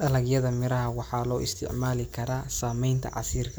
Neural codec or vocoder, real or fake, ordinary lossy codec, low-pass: none; real; none; none